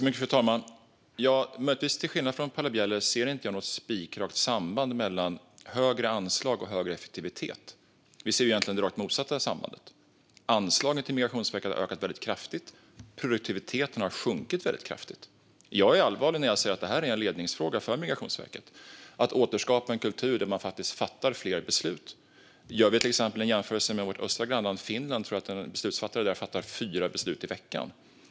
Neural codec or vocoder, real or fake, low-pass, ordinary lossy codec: none; real; none; none